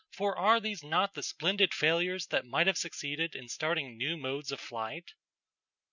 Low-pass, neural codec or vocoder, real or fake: 7.2 kHz; none; real